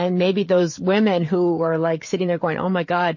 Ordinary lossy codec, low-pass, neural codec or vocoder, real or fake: MP3, 32 kbps; 7.2 kHz; codec, 16 kHz, 16 kbps, FreqCodec, smaller model; fake